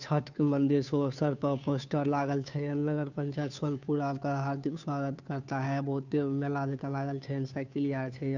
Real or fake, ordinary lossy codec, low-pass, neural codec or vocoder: fake; none; 7.2 kHz; codec, 16 kHz, 2 kbps, FunCodec, trained on Chinese and English, 25 frames a second